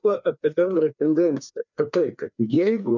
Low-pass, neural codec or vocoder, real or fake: 7.2 kHz; codec, 16 kHz, 2 kbps, FreqCodec, larger model; fake